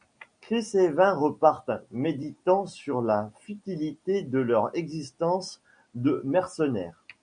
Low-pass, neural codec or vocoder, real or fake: 9.9 kHz; none; real